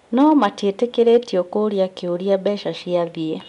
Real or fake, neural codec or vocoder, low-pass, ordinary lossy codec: real; none; 10.8 kHz; none